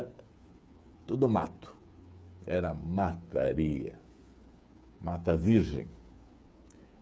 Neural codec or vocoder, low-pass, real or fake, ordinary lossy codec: codec, 16 kHz, 8 kbps, FreqCodec, smaller model; none; fake; none